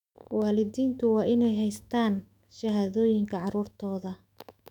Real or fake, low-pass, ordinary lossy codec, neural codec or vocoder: fake; 19.8 kHz; none; autoencoder, 48 kHz, 128 numbers a frame, DAC-VAE, trained on Japanese speech